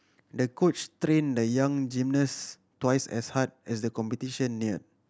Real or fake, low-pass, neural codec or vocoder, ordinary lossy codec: real; none; none; none